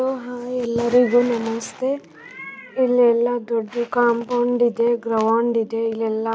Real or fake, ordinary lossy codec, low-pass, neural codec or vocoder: real; none; none; none